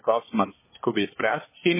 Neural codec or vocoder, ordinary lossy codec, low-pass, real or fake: codec, 24 kHz, 1 kbps, SNAC; MP3, 16 kbps; 3.6 kHz; fake